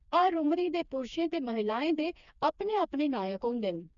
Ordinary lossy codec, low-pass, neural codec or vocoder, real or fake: none; 7.2 kHz; codec, 16 kHz, 2 kbps, FreqCodec, smaller model; fake